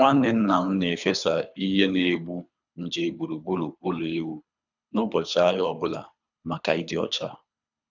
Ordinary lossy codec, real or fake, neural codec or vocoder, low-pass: none; fake; codec, 24 kHz, 3 kbps, HILCodec; 7.2 kHz